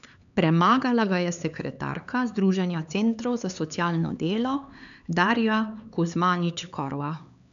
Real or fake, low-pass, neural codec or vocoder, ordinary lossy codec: fake; 7.2 kHz; codec, 16 kHz, 4 kbps, X-Codec, HuBERT features, trained on LibriSpeech; none